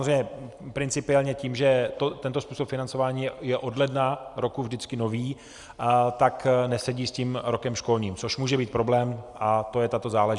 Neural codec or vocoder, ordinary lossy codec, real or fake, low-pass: none; Opus, 64 kbps; real; 10.8 kHz